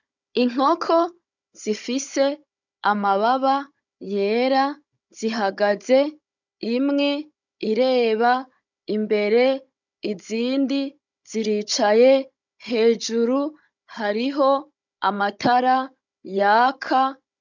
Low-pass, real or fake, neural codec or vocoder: 7.2 kHz; fake; codec, 16 kHz, 16 kbps, FunCodec, trained on Chinese and English, 50 frames a second